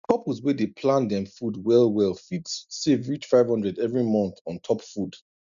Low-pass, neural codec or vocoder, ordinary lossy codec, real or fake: 7.2 kHz; none; none; real